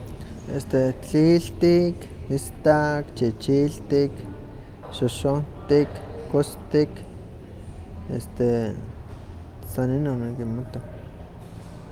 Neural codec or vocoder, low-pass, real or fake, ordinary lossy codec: none; 19.8 kHz; real; Opus, 32 kbps